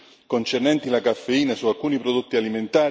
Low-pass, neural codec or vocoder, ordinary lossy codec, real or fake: none; none; none; real